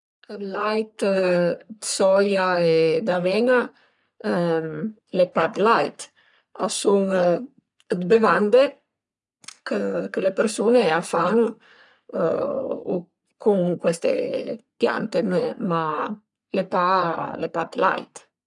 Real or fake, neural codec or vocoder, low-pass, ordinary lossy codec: fake; codec, 44.1 kHz, 3.4 kbps, Pupu-Codec; 10.8 kHz; none